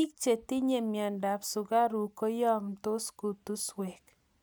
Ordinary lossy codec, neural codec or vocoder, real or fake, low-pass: none; none; real; none